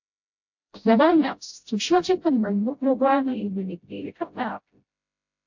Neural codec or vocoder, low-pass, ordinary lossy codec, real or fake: codec, 16 kHz, 0.5 kbps, FreqCodec, smaller model; 7.2 kHz; AAC, 48 kbps; fake